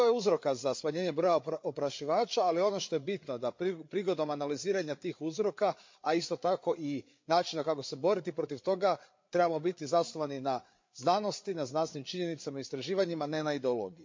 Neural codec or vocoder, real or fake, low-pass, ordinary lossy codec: codec, 16 kHz, 8 kbps, FreqCodec, larger model; fake; 7.2 kHz; MP3, 48 kbps